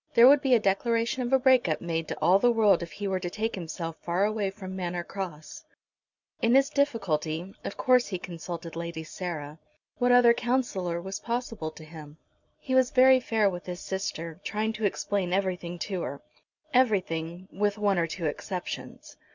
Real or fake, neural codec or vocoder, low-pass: real; none; 7.2 kHz